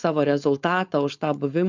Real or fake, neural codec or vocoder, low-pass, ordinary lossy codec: fake; codec, 16 kHz, 4.8 kbps, FACodec; 7.2 kHz; MP3, 64 kbps